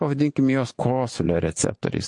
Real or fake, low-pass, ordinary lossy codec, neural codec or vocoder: fake; 10.8 kHz; MP3, 48 kbps; vocoder, 24 kHz, 100 mel bands, Vocos